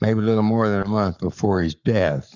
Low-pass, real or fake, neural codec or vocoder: 7.2 kHz; fake; codec, 44.1 kHz, 7.8 kbps, Pupu-Codec